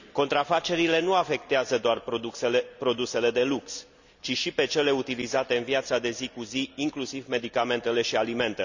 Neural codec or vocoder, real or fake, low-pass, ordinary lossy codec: none; real; 7.2 kHz; none